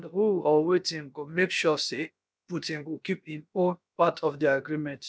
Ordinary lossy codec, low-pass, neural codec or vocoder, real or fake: none; none; codec, 16 kHz, about 1 kbps, DyCAST, with the encoder's durations; fake